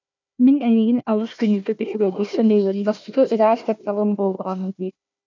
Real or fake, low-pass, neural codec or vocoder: fake; 7.2 kHz; codec, 16 kHz, 1 kbps, FunCodec, trained on Chinese and English, 50 frames a second